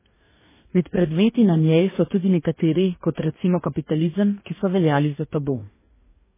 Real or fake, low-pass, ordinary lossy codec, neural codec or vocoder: fake; 3.6 kHz; MP3, 16 kbps; codec, 44.1 kHz, 2.6 kbps, DAC